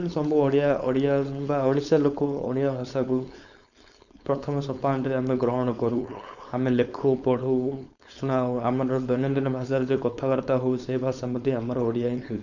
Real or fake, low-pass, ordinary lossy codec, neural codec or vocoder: fake; 7.2 kHz; none; codec, 16 kHz, 4.8 kbps, FACodec